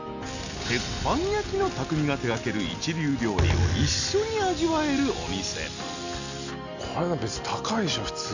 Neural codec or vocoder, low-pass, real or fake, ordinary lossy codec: none; 7.2 kHz; real; none